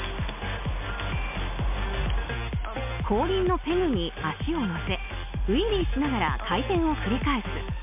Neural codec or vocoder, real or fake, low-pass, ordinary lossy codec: none; real; 3.6 kHz; MP3, 32 kbps